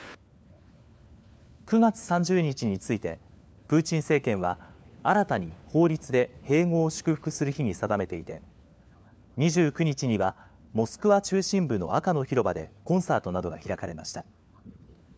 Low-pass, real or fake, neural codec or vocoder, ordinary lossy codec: none; fake; codec, 16 kHz, 4 kbps, FunCodec, trained on LibriTTS, 50 frames a second; none